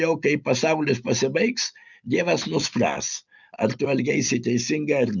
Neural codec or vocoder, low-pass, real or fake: none; 7.2 kHz; real